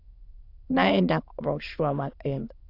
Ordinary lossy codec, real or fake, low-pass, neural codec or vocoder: AAC, 32 kbps; fake; 5.4 kHz; autoencoder, 22.05 kHz, a latent of 192 numbers a frame, VITS, trained on many speakers